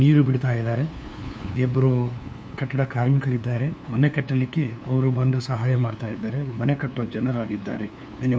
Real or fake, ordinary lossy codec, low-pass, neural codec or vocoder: fake; none; none; codec, 16 kHz, 2 kbps, FunCodec, trained on LibriTTS, 25 frames a second